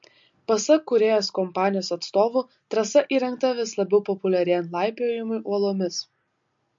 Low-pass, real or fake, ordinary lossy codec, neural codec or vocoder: 7.2 kHz; real; MP3, 48 kbps; none